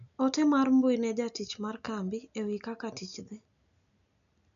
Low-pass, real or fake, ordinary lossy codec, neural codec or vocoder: 7.2 kHz; real; none; none